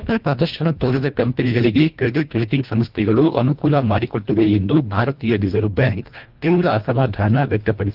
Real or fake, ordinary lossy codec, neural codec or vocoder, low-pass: fake; Opus, 24 kbps; codec, 24 kHz, 1.5 kbps, HILCodec; 5.4 kHz